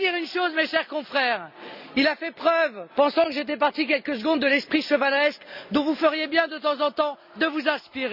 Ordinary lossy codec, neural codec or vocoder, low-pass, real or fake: none; none; 5.4 kHz; real